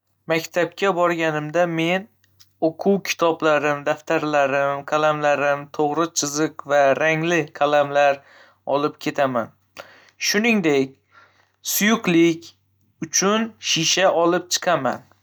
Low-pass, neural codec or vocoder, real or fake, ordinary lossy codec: none; none; real; none